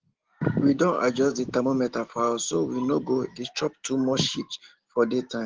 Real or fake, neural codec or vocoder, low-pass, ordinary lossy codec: real; none; 7.2 kHz; Opus, 16 kbps